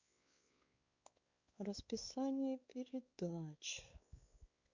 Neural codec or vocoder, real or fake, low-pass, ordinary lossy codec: codec, 16 kHz, 4 kbps, X-Codec, WavLM features, trained on Multilingual LibriSpeech; fake; 7.2 kHz; Opus, 64 kbps